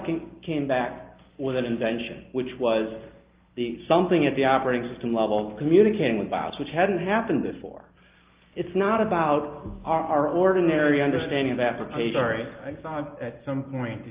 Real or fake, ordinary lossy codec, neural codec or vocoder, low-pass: real; Opus, 24 kbps; none; 3.6 kHz